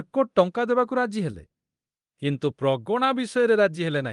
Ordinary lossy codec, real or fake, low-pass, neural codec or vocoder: Opus, 24 kbps; fake; 10.8 kHz; codec, 24 kHz, 0.9 kbps, DualCodec